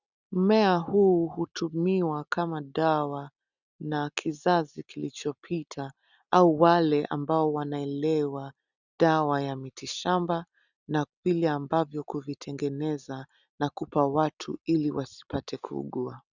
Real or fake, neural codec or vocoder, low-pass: real; none; 7.2 kHz